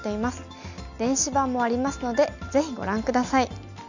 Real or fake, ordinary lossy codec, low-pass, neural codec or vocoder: real; none; 7.2 kHz; none